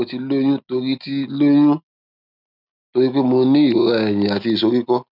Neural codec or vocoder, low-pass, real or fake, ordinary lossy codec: none; 5.4 kHz; real; none